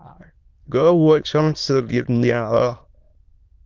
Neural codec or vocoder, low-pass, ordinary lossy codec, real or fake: autoencoder, 22.05 kHz, a latent of 192 numbers a frame, VITS, trained on many speakers; 7.2 kHz; Opus, 32 kbps; fake